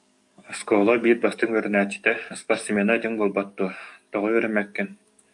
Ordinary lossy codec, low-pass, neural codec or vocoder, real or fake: AAC, 64 kbps; 10.8 kHz; codec, 44.1 kHz, 7.8 kbps, DAC; fake